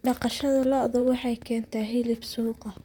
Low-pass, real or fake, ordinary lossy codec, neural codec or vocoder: 19.8 kHz; fake; none; vocoder, 44.1 kHz, 128 mel bands, Pupu-Vocoder